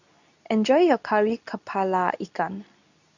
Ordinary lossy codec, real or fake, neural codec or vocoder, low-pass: none; fake; codec, 24 kHz, 0.9 kbps, WavTokenizer, medium speech release version 2; 7.2 kHz